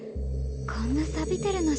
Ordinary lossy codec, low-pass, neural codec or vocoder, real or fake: none; none; none; real